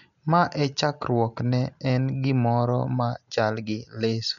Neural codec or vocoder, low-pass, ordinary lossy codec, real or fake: none; 7.2 kHz; none; real